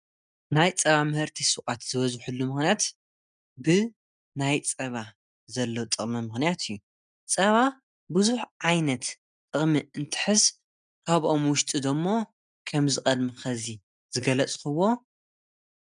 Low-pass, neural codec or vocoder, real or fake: 9.9 kHz; none; real